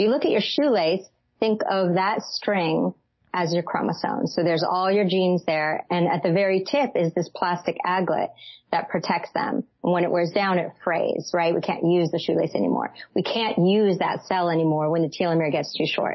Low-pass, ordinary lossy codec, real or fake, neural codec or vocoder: 7.2 kHz; MP3, 24 kbps; real; none